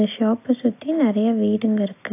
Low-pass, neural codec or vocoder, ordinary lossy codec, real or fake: 3.6 kHz; none; AAC, 24 kbps; real